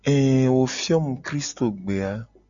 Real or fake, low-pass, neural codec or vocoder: real; 7.2 kHz; none